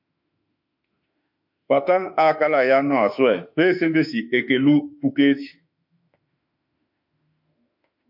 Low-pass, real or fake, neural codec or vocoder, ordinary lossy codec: 5.4 kHz; fake; autoencoder, 48 kHz, 32 numbers a frame, DAC-VAE, trained on Japanese speech; MP3, 48 kbps